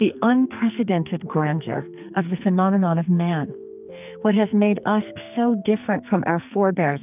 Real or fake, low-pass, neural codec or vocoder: fake; 3.6 kHz; codec, 44.1 kHz, 2.6 kbps, SNAC